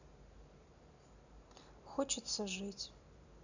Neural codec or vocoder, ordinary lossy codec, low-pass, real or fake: none; none; 7.2 kHz; real